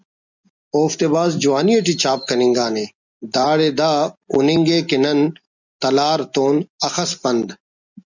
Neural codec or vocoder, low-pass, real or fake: none; 7.2 kHz; real